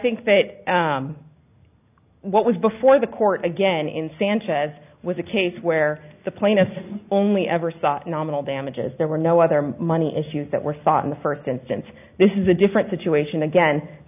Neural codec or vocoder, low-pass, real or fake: none; 3.6 kHz; real